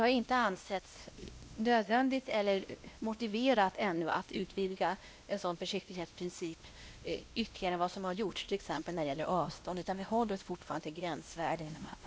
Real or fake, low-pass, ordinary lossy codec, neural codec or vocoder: fake; none; none; codec, 16 kHz, 1 kbps, X-Codec, WavLM features, trained on Multilingual LibriSpeech